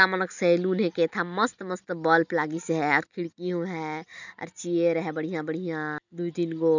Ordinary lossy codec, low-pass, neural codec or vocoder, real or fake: none; 7.2 kHz; none; real